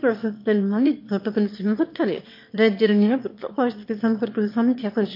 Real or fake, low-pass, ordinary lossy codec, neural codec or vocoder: fake; 5.4 kHz; MP3, 32 kbps; autoencoder, 22.05 kHz, a latent of 192 numbers a frame, VITS, trained on one speaker